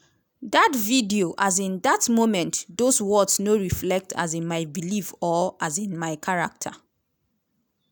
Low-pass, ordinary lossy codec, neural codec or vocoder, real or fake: none; none; none; real